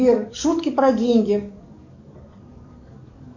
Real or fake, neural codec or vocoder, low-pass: fake; codec, 44.1 kHz, 7.8 kbps, DAC; 7.2 kHz